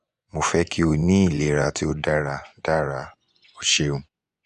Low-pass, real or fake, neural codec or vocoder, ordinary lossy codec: 10.8 kHz; fake; vocoder, 24 kHz, 100 mel bands, Vocos; none